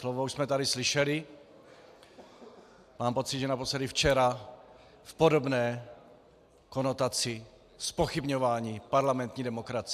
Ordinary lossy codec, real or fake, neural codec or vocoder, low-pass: AAC, 96 kbps; real; none; 14.4 kHz